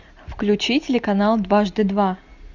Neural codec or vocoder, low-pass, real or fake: none; 7.2 kHz; real